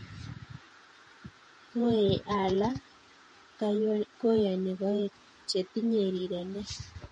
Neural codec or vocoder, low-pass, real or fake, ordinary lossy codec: vocoder, 48 kHz, 128 mel bands, Vocos; 19.8 kHz; fake; MP3, 48 kbps